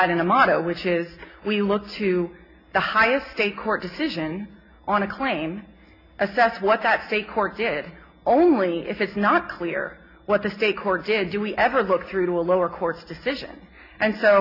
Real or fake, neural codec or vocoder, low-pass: real; none; 5.4 kHz